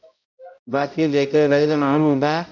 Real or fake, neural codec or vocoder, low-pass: fake; codec, 16 kHz, 0.5 kbps, X-Codec, HuBERT features, trained on balanced general audio; 7.2 kHz